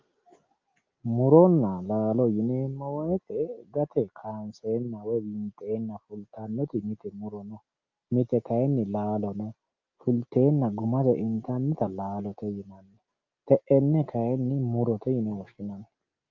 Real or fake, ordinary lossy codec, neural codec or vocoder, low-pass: real; Opus, 24 kbps; none; 7.2 kHz